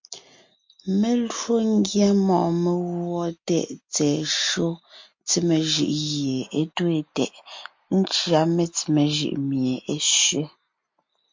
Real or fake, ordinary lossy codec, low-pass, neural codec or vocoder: real; MP3, 48 kbps; 7.2 kHz; none